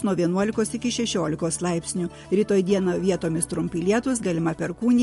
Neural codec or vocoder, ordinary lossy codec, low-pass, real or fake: none; MP3, 48 kbps; 14.4 kHz; real